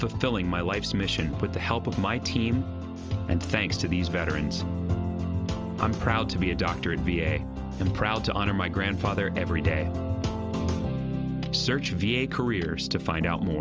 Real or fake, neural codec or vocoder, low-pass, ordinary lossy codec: real; none; 7.2 kHz; Opus, 24 kbps